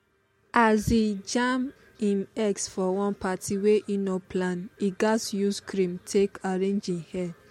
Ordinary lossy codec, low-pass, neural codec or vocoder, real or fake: MP3, 64 kbps; 19.8 kHz; none; real